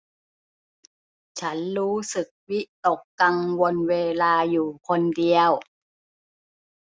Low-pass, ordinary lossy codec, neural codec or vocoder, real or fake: none; none; none; real